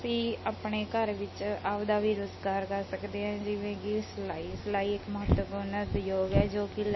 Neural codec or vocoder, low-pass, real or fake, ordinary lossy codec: none; 7.2 kHz; real; MP3, 24 kbps